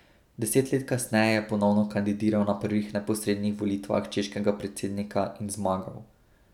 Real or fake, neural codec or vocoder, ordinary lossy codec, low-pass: real; none; none; 19.8 kHz